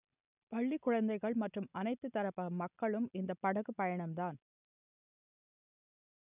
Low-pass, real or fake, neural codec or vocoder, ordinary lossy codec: 3.6 kHz; real; none; none